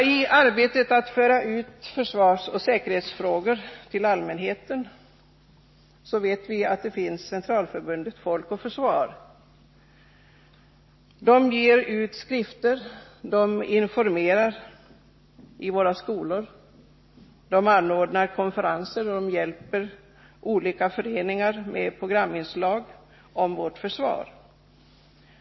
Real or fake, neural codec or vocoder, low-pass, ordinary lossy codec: real; none; 7.2 kHz; MP3, 24 kbps